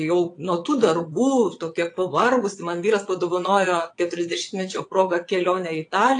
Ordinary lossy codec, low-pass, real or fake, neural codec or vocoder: AAC, 48 kbps; 9.9 kHz; fake; vocoder, 22.05 kHz, 80 mel bands, Vocos